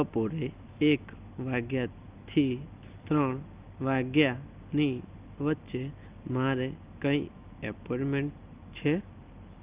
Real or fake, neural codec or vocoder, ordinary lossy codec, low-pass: real; none; Opus, 32 kbps; 3.6 kHz